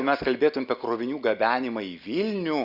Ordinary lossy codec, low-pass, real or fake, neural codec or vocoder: Opus, 64 kbps; 5.4 kHz; real; none